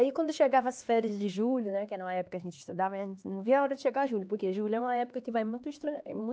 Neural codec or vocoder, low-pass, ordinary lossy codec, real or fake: codec, 16 kHz, 2 kbps, X-Codec, HuBERT features, trained on LibriSpeech; none; none; fake